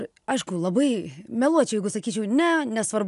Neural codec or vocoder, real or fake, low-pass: none; real; 10.8 kHz